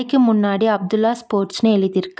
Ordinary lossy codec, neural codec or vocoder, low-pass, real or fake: none; none; none; real